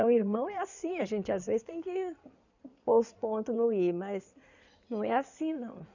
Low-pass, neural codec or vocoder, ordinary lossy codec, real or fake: 7.2 kHz; codec, 16 kHz in and 24 kHz out, 2.2 kbps, FireRedTTS-2 codec; none; fake